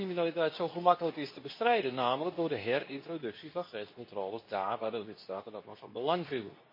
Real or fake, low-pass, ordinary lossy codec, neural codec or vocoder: fake; 5.4 kHz; MP3, 24 kbps; codec, 24 kHz, 0.9 kbps, WavTokenizer, medium speech release version 2